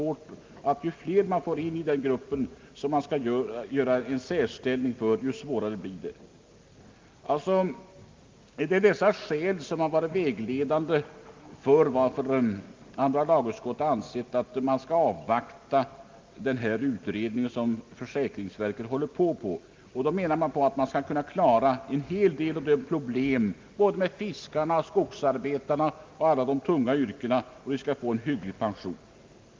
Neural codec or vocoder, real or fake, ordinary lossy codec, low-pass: none; real; Opus, 24 kbps; 7.2 kHz